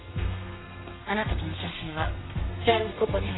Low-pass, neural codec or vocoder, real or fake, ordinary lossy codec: 7.2 kHz; codec, 32 kHz, 1.9 kbps, SNAC; fake; AAC, 16 kbps